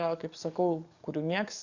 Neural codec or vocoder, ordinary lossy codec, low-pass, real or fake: codec, 44.1 kHz, 7.8 kbps, DAC; Opus, 64 kbps; 7.2 kHz; fake